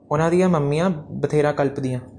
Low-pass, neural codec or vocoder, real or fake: 9.9 kHz; none; real